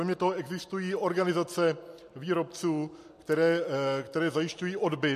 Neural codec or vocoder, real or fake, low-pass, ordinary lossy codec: none; real; 14.4 kHz; MP3, 64 kbps